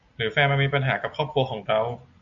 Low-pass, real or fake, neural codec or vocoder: 7.2 kHz; real; none